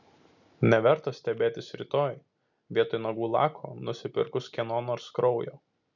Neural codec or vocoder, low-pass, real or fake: none; 7.2 kHz; real